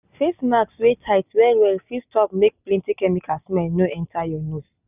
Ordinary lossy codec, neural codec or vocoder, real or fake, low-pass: none; none; real; 3.6 kHz